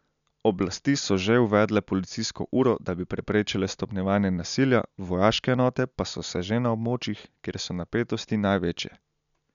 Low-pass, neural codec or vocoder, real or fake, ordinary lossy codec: 7.2 kHz; none; real; none